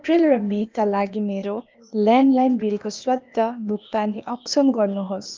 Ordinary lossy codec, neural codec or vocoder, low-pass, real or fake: Opus, 24 kbps; codec, 16 kHz, 0.8 kbps, ZipCodec; 7.2 kHz; fake